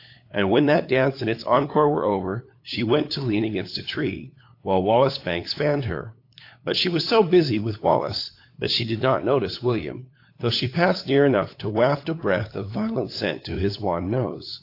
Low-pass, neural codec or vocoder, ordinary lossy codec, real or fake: 5.4 kHz; codec, 16 kHz, 8 kbps, FunCodec, trained on LibriTTS, 25 frames a second; AAC, 32 kbps; fake